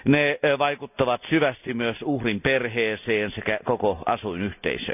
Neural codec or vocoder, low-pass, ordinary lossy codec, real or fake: none; 3.6 kHz; none; real